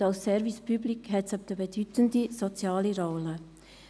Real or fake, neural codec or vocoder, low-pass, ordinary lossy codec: real; none; none; none